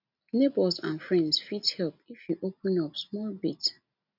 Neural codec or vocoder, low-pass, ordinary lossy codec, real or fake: none; 5.4 kHz; none; real